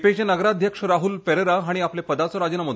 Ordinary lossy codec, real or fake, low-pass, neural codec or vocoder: none; real; none; none